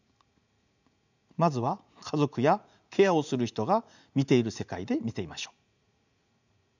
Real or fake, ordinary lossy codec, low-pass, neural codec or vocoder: fake; none; 7.2 kHz; vocoder, 44.1 kHz, 80 mel bands, Vocos